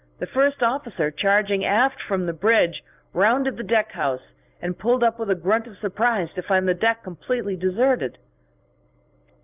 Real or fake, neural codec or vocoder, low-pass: fake; vocoder, 44.1 kHz, 128 mel bands every 256 samples, BigVGAN v2; 3.6 kHz